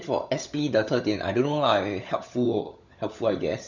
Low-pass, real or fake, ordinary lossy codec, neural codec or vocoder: 7.2 kHz; fake; none; codec, 16 kHz, 16 kbps, FunCodec, trained on LibriTTS, 50 frames a second